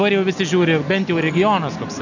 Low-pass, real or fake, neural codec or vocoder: 7.2 kHz; real; none